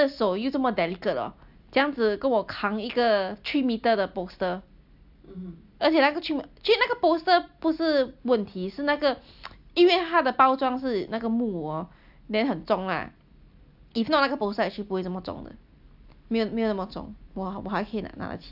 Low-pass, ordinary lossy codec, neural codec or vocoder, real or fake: 5.4 kHz; AAC, 48 kbps; none; real